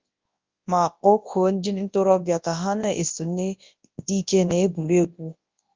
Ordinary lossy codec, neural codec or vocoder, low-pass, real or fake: Opus, 32 kbps; codec, 24 kHz, 0.9 kbps, WavTokenizer, large speech release; 7.2 kHz; fake